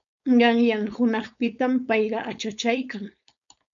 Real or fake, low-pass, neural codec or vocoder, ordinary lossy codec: fake; 7.2 kHz; codec, 16 kHz, 4.8 kbps, FACodec; MP3, 96 kbps